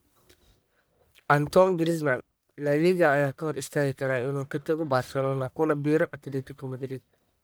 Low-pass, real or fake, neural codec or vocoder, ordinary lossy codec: none; fake; codec, 44.1 kHz, 1.7 kbps, Pupu-Codec; none